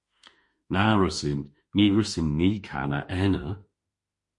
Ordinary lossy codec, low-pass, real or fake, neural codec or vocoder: MP3, 48 kbps; 10.8 kHz; fake; autoencoder, 48 kHz, 32 numbers a frame, DAC-VAE, trained on Japanese speech